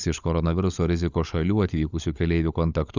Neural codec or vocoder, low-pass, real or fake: codec, 16 kHz, 16 kbps, FunCodec, trained on LibriTTS, 50 frames a second; 7.2 kHz; fake